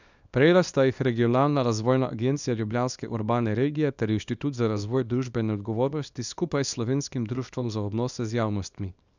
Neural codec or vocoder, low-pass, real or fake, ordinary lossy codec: codec, 24 kHz, 0.9 kbps, WavTokenizer, small release; 7.2 kHz; fake; none